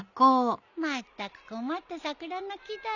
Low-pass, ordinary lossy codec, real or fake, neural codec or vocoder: 7.2 kHz; none; real; none